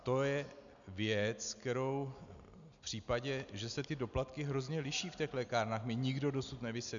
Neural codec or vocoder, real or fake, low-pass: none; real; 7.2 kHz